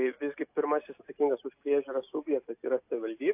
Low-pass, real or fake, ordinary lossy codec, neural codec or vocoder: 3.6 kHz; real; AAC, 32 kbps; none